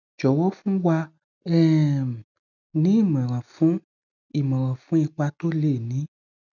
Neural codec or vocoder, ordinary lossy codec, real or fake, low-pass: none; none; real; 7.2 kHz